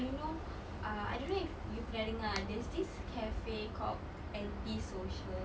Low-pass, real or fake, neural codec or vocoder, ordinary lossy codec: none; real; none; none